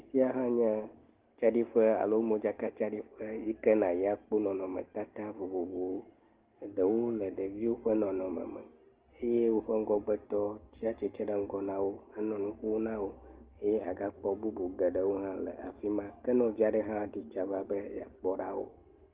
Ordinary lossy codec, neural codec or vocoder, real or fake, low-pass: Opus, 16 kbps; none; real; 3.6 kHz